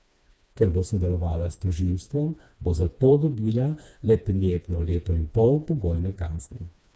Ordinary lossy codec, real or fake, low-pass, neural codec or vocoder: none; fake; none; codec, 16 kHz, 2 kbps, FreqCodec, smaller model